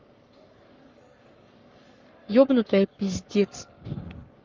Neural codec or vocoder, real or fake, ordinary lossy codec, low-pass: codec, 44.1 kHz, 7.8 kbps, Pupu-Codec; fake; Opus, 32 kbps; 7.2 kHz